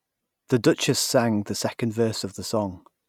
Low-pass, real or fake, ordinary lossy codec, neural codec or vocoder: 19.8 kHz; real; none; none